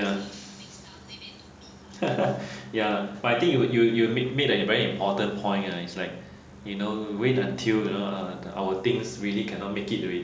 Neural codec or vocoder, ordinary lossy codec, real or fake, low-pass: none; none; real; none